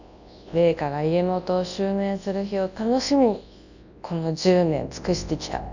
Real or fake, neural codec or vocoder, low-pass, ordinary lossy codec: fake; codec, 24 kHz, 0.9 kbps, WavTokenizer, large speech release; 7.2 kHz; none